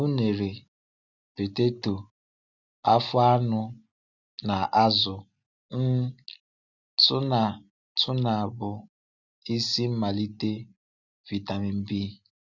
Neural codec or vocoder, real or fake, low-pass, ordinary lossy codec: none; real; 7.2 kHz; none